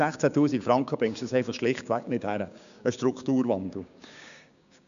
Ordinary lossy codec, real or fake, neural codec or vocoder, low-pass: none; fake; codec, 16 kHz, 6 kbps, DAC; 7.2 kHz